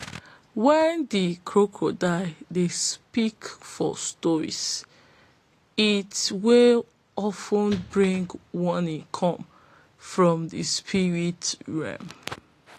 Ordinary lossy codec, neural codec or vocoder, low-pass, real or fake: AAC, 48 kbps; none; 14.4 kHz; real